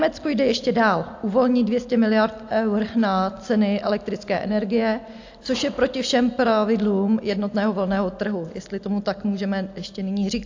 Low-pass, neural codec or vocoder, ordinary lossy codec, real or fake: 7.2 kHz; none; AAC, 48 kbps; real